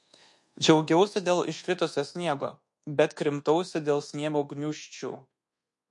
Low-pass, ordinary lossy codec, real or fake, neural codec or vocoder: 10.8 kHz; MP3, 48 kbps; fake; codec, 24 kHz, 1.2 kbps, DualCodec